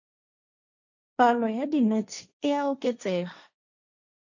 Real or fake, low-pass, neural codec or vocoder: fake; 7.2 kHz; codec, 16 kHz, 1.1 kbps, Voila-Tokenizer